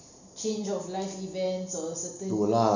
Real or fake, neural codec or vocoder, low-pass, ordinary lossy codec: real; none; 7.2 kHz; none